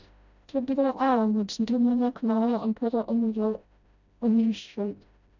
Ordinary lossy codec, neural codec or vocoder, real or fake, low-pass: none; codec, 16 kHz, 0.5 kbps, FreqCodec, smaller model; fake; 7.2 kHz